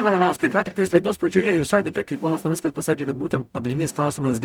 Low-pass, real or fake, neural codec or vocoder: 19.8 kHz; fake; codec, 44.1 kHz, 0.9 kbps, DAC